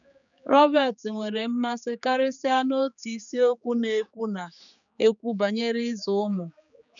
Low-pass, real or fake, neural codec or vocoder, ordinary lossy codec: 7.2 kHz; fake; codec, 16 kHz, 4 kbps, X-Codec, HuBERT features, trained on general audio; none